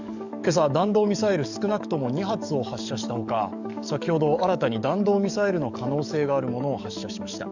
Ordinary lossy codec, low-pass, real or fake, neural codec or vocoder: none; 7.2 kHz; fake; codec, 44.1 kHz, 7.8 kbps, DAC